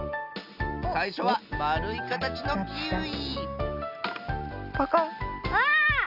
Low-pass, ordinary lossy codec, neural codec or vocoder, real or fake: 5.4 kHz; none; none; real